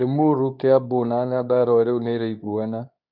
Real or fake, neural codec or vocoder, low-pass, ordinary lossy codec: fake; codec, 24 kHz, 0.9 kbps, WavTokenizer, medium speech release version 2; 5.4 kHz; none